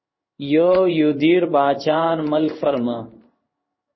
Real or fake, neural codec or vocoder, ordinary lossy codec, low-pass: fake; codec, 16 kHz in and 24 kHz out, 1 kbps, XY-Tokenizer; MP3, 24 kbps; 7.2 kHz